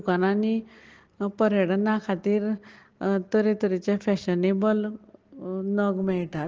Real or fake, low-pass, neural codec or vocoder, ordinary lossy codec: real; 7.2 kHz; none; Opus, 16 kbps